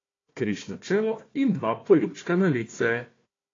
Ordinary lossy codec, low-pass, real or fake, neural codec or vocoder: AAC, 32 kbps; 7.2 kHz; fake; codec, 16 kHz, 1 kbps, FunCodec, trained on Chinese and English, 50 frames a second